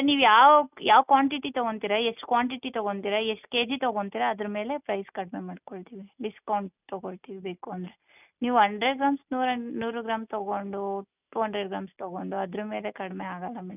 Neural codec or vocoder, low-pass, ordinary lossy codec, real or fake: none; 3.6 kHz; none; real